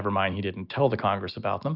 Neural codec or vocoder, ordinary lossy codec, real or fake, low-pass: none; Opus, 64 kbps; real; 5.4 kHz